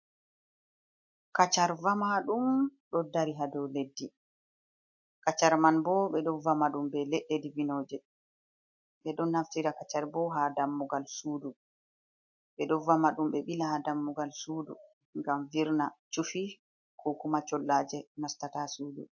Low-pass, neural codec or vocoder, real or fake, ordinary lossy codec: 7.2 kHz; none; real; MP3, 48 kbps